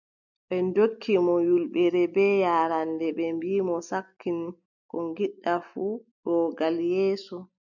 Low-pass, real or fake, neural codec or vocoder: 7.2 kHz; real; none